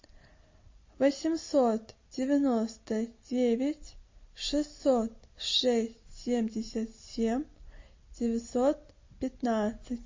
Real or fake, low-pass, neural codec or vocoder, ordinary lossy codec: real; 7.2 kHz; none; MP3, 32 kbps